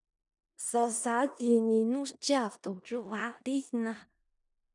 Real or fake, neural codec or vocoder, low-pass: fake; codec, 16 kHz in and 24 kHz out, 0.4 kbps, LongCat-Audio-Codec, four codebook decoder; 10.8 kHz